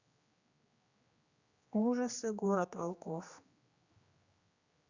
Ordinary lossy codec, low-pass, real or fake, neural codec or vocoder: Opus, 64 kbps; 7.2 kHz; fake; codec, 16 kHz, 2 kbps, X-Codec, HuBERT features, trained on general audio